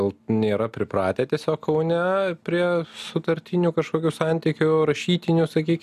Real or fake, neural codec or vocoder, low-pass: real; none; 14.4 kHz